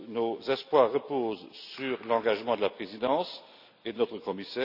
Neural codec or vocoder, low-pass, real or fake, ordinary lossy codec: none; 5.4 kHz; real; none